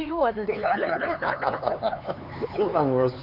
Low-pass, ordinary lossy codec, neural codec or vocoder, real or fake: 5.4 kHz; none; codec, 16 kHz, 4 kbps, X-Codec, HuBERT features, trained on LibriSpeech; fake